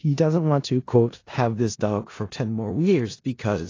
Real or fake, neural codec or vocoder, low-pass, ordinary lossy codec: fake; codec, 16 kHz in and 24 kHz out, 0.4 kbps, LongCat-Audio-Codec, four codebook decoder; 7.2 kHz; AAC, 32 kbps